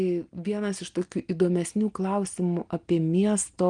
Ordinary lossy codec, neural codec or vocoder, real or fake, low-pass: Opus, 24 kbps; none; real; 9.9 kHz